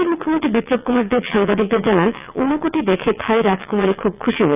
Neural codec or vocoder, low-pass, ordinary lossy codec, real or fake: vocoder, 22.05 kHz, 80 mel bands, WaveNeXt; 3.6 kHz; none; fake